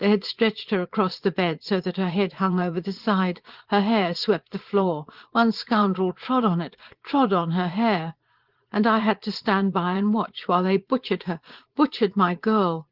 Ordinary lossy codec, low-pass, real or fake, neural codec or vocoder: Opus, 16 kbps; 5.4 kHz; fake; vocoder, 44.1 kHz, 80 mel bands, Vocos